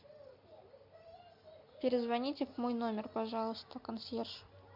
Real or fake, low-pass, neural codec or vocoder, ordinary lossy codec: real; 5.4 kHz; none; MP3, 48 kbps